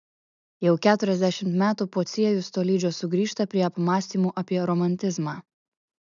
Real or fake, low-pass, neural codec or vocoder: real; 7.2 kHz; none